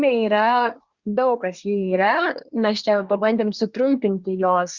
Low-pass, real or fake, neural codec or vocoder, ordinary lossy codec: 7.2 kHz; fake; codec, 24 kHz, 1 kbps, SNAC; Opus, 64 kbps